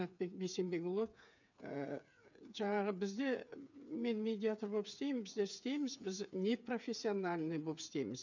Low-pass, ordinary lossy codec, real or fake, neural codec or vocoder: 7.2 kHz; none; fake; codec, 16 kHz, 8 kbps, FreqCodec, smaller model